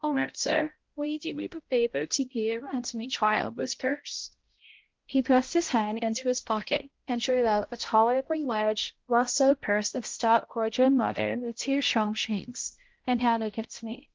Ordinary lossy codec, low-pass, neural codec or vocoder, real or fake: Opus, 16 kbps; 7.2 kHz; codec, 16 kHz, 0.5 kbps, X-Codec, HuBERT features, trained on balanced general audio; fake